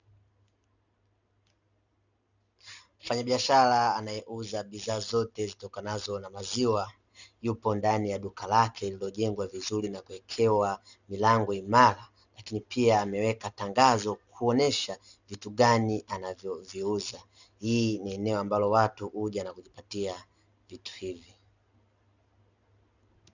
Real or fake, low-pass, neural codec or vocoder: real; 7.2 kHz; none